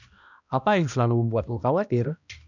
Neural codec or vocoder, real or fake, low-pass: codec, 16 kHz, 1 kbps, X-Codec, HuBERT features, trained on balanced general audio; fake; 7.2 kHz